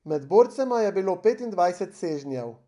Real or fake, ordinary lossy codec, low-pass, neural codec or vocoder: real; none; 10.8 kHz; none